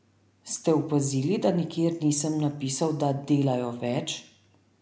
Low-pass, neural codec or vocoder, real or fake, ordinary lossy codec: none; none; real; none